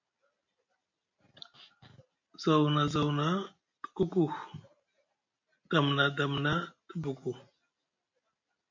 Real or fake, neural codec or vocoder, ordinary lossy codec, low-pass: real; none; MP3, 48 kbps; 7.2 kHz